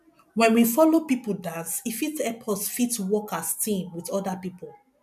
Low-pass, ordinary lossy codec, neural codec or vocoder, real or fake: 14.4 kHz; none; none; real